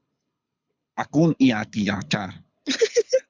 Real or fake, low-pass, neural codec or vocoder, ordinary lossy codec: fake; 7.2 kHz; codec, 24 kHz, 6 kbps, HILCodec; MP3, 64 kbps